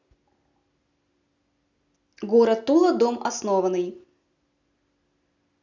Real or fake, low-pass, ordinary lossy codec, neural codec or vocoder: real; 7.2 kHz; none; none